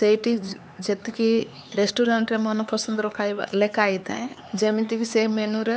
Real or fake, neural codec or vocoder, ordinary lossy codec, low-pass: fake; codec, 16 kHz, 4 kbps, X-Codec, HuBERT features, trained on LibriSpeech; none; none